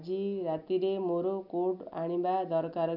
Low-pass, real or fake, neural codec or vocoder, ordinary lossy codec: 5.4 kHz; real; none; none